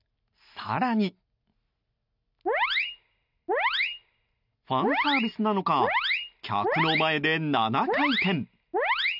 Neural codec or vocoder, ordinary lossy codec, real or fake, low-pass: none; none; real; 5.4 kHz